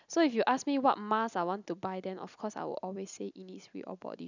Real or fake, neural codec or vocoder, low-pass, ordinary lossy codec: real; none; 7.2 kHz; none